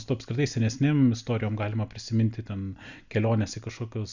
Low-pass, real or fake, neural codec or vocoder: 7.2 kHz; real; none